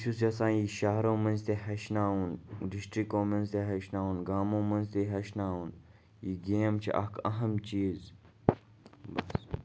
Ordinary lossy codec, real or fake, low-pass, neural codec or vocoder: none; real; none; none